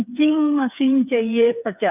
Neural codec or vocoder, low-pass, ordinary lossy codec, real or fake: codec, 16 kHz, 4 kbps, FreqCodec, smaller model; 3.6 kHz; none; fake